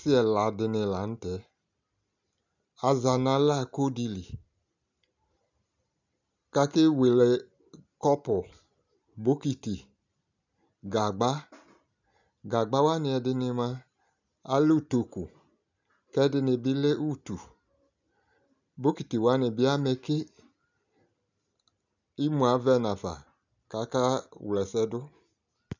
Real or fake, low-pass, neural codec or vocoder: real; 7.2 kHz; none